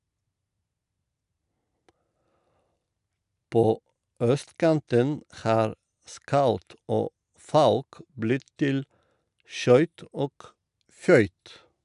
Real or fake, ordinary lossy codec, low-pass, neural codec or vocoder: real; none; 10.8 kHz; none